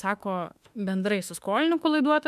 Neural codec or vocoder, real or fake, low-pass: autoencoder, 48 kHz, 32 numbers a frame, DAC-VAE, trained on Japanese speech; fake; 14.4 kHz